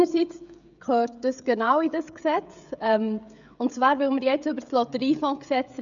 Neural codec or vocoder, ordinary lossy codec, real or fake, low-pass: codec, 16 kHz, 16 kbps, FreqCodec, smaller model; none; fake; 7.2 kHz